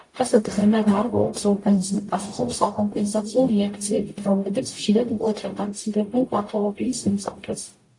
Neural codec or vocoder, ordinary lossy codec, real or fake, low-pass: codec, 44.1 kHz, 0.9 kbps, DAC; AAC, 48 kbps; fake; 10.8 kHz